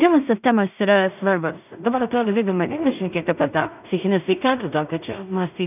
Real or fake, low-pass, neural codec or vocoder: fake; 3.6 kHz; codec, 16 kHz in and 24 kHz out, 0.4 kbps, LongCat-Audio-Codec, two codebook decoder